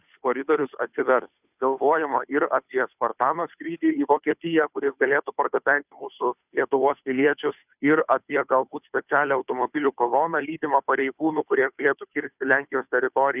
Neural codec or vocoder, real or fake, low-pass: codec, 16 kHz, 2 kbps, FunCodec, trained on Chinese and English, 25 frames a second; fake; 3.6 kHz